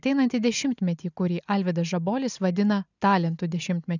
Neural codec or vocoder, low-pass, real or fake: none; 7.2 kHz; real